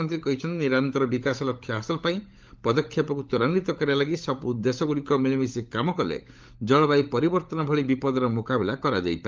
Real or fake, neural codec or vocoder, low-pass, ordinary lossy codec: fake; codec, 16 kHz, 16 kbps, FunCodec, trained on LibriTTS, 50 frames a second; 7.2 kHz; Opus, 32 kbps